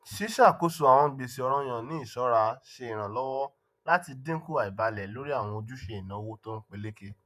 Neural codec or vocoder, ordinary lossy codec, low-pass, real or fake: vocoder, 48 kHz, 128 mel bands, Vocos; none; 14.4 kHz; fake